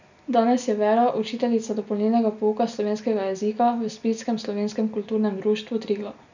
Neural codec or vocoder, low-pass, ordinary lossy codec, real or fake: none; 7.2 kHz; none; real